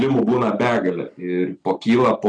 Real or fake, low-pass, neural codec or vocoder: real; 9.9 kHz; none